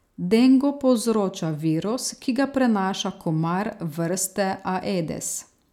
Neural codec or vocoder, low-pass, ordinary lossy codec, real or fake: none; 19.8 kHz; none; real